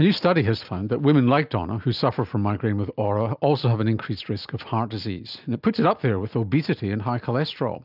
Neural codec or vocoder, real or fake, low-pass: none; real; 5.4 kHz